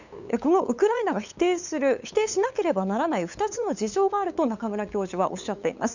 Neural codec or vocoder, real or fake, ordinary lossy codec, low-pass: codec, 16 kHz, 8 kbps, FunCodec, trained on LibriTTS, 25 frames a second; fake; none; 7.2 kHz